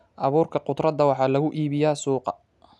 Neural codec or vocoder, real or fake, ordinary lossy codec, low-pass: none; real; none; none